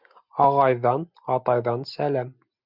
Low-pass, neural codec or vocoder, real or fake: 5.4 kHz; none; real